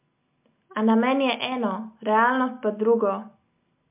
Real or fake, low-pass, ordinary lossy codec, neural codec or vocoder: real; 3.6 kHz; MP3, 32 kbps; none